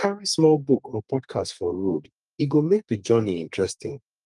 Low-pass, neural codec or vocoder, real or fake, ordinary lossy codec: 10.8 kHz; codec, 32 kHz, 1.9 kbps, SNAC; fake; Opus, 32 kbps